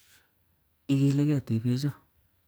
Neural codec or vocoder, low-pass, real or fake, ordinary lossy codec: codec, 44.1 kHz, 2.6 kbps, SNAC; none; fake; none